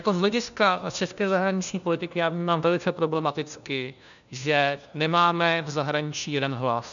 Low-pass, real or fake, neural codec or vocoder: 7.2 kHz; fake; codec, 16 kHz, 1 kbps, FunCodec, trained on LibriTTS, 50 frames a second